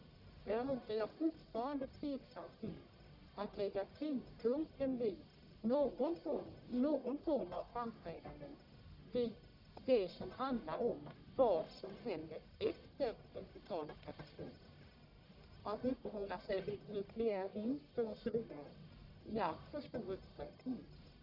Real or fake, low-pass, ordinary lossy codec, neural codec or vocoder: fake; 5.4 kHz; none; codec, 44.1 kHz, 1.7 kbps, Pupu-Codec